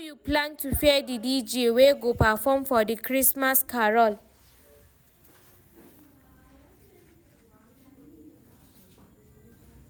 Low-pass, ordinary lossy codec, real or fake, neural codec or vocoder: none; none; real; none